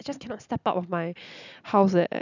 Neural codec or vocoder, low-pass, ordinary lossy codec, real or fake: none; 7.2 kHz; none; real